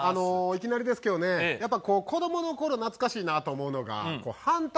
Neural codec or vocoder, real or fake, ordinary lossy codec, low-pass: none; real; none; none